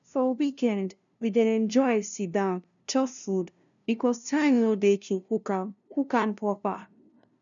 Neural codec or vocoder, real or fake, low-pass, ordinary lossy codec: codec, 16 kHz, 0.5 kbps, FunCodec, trained on LibriTTS, 25 frames a second; fake; 7.2 kHz; none